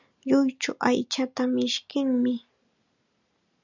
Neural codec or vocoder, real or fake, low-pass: none; real; 7.2 kHz